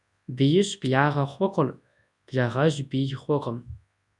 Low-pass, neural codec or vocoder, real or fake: 10.8 kHz; codec, 24 kHz, 0.9 kbps, WavTokenizer, large speech release; fake